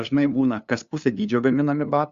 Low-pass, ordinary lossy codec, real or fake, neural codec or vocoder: 7.2 kHz; Opus, 64 kbps; fake; codec, 16 kHz, 2 kbps, FunCodec, trained on LibriTTS, 25 frames a second